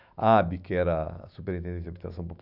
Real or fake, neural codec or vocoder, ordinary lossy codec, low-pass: fake; autoencoder, 48 kHz, 128 numbers a frame, DAC-VAE, trained on Japanese speech; none; 5.4 kHz